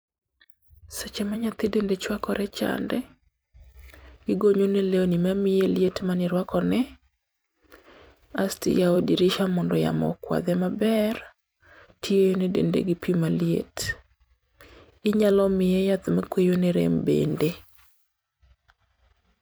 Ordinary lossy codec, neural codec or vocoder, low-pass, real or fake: none; vocoder, 44.1 kHz, 128 mel bands every 256 samples, BigVGAN v2; none; fake